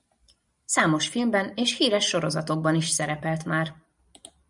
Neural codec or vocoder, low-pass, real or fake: vocoder, 44.1 kHz, 128 mel bands every 512 samples, BigVGAN v2; 10.8 kHz; fake